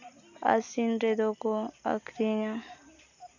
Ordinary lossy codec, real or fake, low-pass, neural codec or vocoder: none; real; 7.2 kHz; none